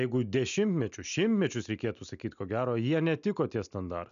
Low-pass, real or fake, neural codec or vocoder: 7.2 kHz; real; none